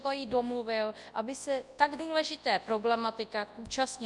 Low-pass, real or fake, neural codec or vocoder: 10.8 kHz; fake; codec, 24 kHz, 0.9 kbps, WavTokenizer, large speech release